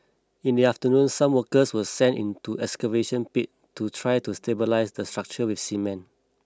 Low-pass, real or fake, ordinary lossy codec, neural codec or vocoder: none; real; none; none